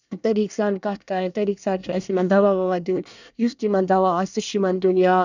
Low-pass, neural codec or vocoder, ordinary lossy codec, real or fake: 7.2 kHz; codec, 24 kHz, 1 kbps, SNAC; none; fake